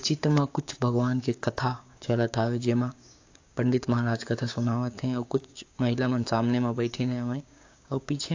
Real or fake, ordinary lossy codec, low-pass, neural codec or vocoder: fake; AAC, 48 kbps; 7.2 kHz; codec, 16 kHz, 6 kbps, DAC